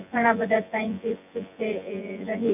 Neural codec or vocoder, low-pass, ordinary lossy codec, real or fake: vocoder, 24 kHz, 100 mel bands, Vocos; 3.6 kHz; none; fake